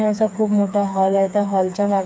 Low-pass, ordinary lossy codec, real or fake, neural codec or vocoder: none; none; fake; codec, 16 kHz, 4 kbps, FreqCodec, smaller model